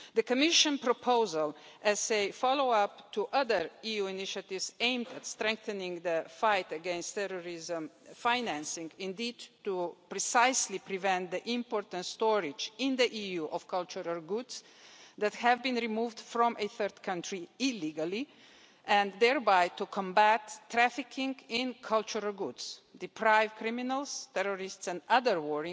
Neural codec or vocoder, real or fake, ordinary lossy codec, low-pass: none; real; none; none